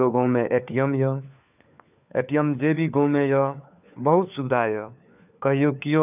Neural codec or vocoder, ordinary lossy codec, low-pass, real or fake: codec, 16 kHz, 4 kbps, FunCodec, trained on LibriTTS, 50 frames a second; none; 3.6 kHz; fake